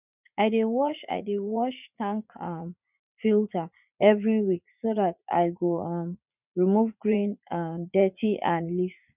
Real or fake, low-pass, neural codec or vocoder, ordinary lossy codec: fake; 3.6 kHz; vocoder, 44.1 kHz, 80 mel bands, Vocos; none